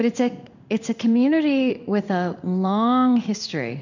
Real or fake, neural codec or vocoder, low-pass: fake; codec, 16 kHz in and 24 kHz out, 1 kbps, XY-Tokenizer; 7.2 kHz